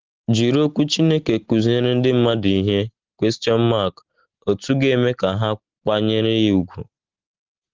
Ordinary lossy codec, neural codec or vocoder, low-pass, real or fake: Opus, 16 kbps; none; 7.2 kHz; real